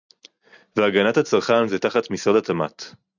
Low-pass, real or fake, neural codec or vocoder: 7.2 kHz; real; none